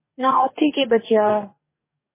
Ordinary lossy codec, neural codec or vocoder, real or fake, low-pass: MP3, 16 kbps; codec, 44.1 kHz, 2.6 kbps, DAC; fake; 3.6 kHz